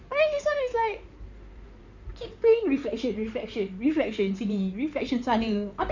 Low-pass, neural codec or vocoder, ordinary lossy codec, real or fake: 7.2 kHz; codec, 16 kHz in and 24 kHz out, 2.2 kbps, FireRedTTS-2 codec; none; fake